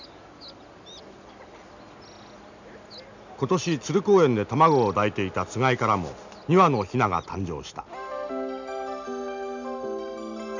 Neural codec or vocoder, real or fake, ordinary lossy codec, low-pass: none; real; none; 7.2 kHz